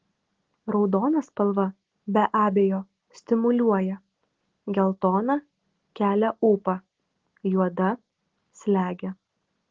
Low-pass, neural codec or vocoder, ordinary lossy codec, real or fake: 7.2 kHz; none; Opus, 16 kbps; real